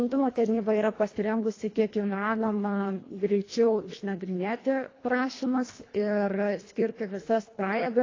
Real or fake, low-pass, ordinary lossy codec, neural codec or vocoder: fake; 7.2 kHz; AAC, 32 kbps; codec, 24 kHz, 1.5 kbps, HILCodec